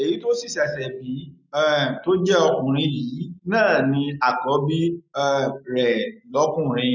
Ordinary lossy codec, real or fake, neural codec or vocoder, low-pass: none; real; none; 7.2 kHz